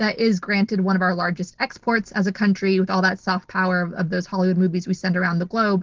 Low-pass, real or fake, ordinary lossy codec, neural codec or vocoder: 7.2 kHz; real; Opus, 16 kbps; none